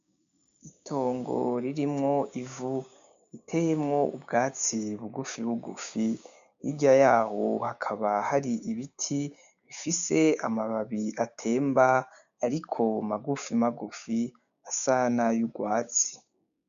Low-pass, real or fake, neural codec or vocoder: 7.2 kHz; fake; codec, 16 kHz, 6 kbps, DAC